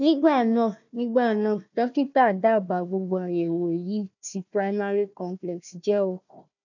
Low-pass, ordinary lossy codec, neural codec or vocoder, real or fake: 7.2 kHz; none; codec, 16 kHz, 1 kbps, FunCodec, trained on Chinese and English, 50 frames a second; fake